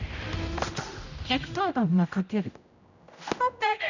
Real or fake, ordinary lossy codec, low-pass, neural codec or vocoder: fake; none; 7.2 kHz; codec, 16 kHz, 0.5 kbps, X-Codec, HuBERT features, trained on general audio